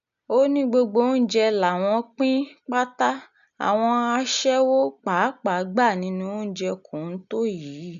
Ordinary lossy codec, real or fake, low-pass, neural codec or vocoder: none; real; 7.2 kHz; none